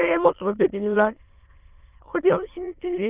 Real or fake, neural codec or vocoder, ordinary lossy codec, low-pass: fake; autoencoder, 22.05 kHz, a latent of 192 numbers a frame, VITS, trained on many speakers; Opus, 24 kbps; 3.6 kHz